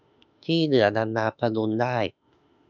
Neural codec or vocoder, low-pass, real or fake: autoencoder, 48 kHz, 32 numbers a frame, DAC-VAE, trained on Japanese speech; 7.2 kHz; fake